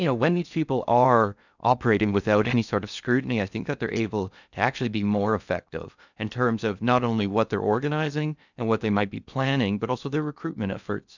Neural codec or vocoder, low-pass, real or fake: codec, 16 kHz in and 24 kHz out, 0.6 kbps, FocalCodec, streaming, 4096 codes; 7.2 kHz; fake